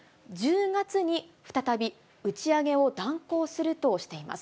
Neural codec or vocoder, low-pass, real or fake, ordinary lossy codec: none; none; real; none